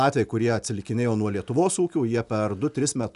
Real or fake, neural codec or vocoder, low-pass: real; none; 10.8 kHz